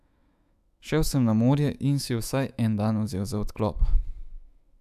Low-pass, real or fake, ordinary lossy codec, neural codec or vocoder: 14.4 kHz; fake; none; autoencoder, 48 kHz, 128 numbers a frame, DAC-VAE, trained on Japanese speech